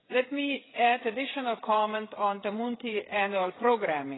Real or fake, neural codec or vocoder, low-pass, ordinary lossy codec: fake; codec, 16 kHz, 8 kbps, FreqCodec, smaller model; 7.2 kHz; AAC, 16 kbps